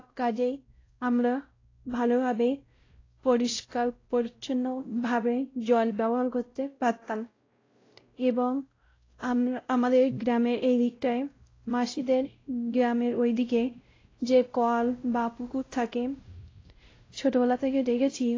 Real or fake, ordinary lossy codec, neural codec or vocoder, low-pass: fake; AAC, 32 kbps; codec, 16 kHz, 0.5 kbps, X-Codec, WavLM features, trained on Multilingual LibriSpeech; 7.2 kHz